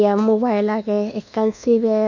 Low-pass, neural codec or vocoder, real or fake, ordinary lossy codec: 7.2 kHz; codec, 24 kHz, 1.2 kbps, DualCodec; fake; none